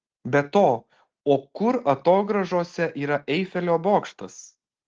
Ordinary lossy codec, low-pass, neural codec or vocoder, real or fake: Opus, 16 kbps; 7.2 kHz; none; real